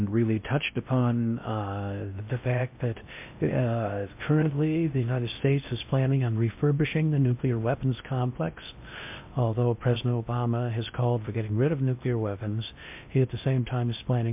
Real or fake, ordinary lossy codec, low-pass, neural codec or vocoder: fake; MP3, 32 kbps; 3.6 kHz; codec, 16 kHz in and 24 kHz out, 0.6 kbps, FocalCodec, streaming, 4096 codes